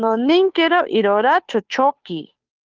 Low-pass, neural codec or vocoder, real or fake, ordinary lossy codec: 7.2 kHz; codec, 16 kHz, 2 kbps, FunCodec, trained on Chinese and English, 25 frames a second; fake; Opus, 32 kbps